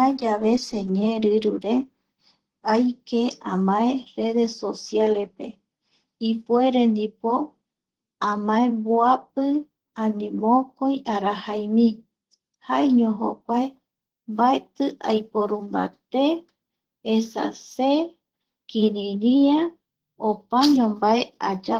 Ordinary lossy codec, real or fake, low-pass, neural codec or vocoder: Opus, 16 kbps; fake; 19.8 kHz; vocoder, 44.1 kHz, 128 mel bands, Pupu-Vocoder